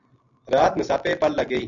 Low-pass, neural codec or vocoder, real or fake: 7.2 kHz; none; real